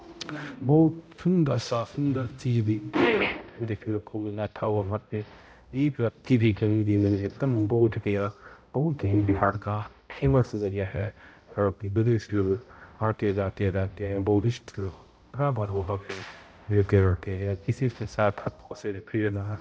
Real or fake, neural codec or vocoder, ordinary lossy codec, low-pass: fake; codec, 16 kHz, 0.5 kbps, X-Codec, HuBERT features, trained on balanced general audio; none; none